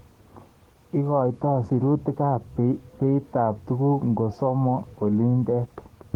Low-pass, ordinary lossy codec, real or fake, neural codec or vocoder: 19.8 kHz; Opus, 16 kbps; fake; codec, 44.1 kHz, 7.8 kbps, DAC